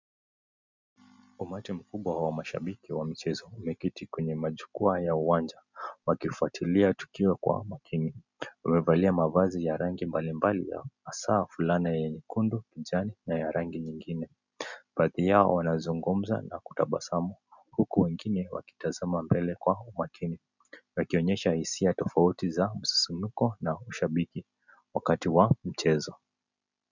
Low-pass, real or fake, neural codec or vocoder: 7.2 kHz; real; none